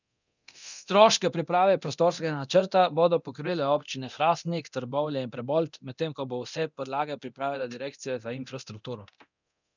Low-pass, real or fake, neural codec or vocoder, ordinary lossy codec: 7.2 kHz; fake; codec, 24 kHz, 0.9 kbps, DualCodec; none